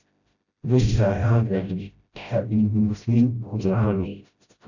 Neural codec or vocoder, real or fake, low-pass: codec, 16 kHz, 0.5 kbps, FreqCodec, smaller model; fake; 7.2 kHz